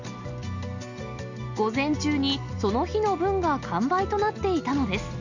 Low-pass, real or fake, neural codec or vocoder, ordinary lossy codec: 7.2 kHz; real; none; Opus, 64 kbps